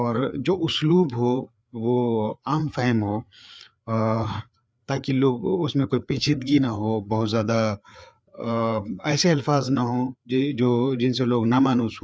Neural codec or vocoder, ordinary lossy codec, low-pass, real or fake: codec, 16 kHz, 4 kbps, FreqCodec, larger model; none; none; fake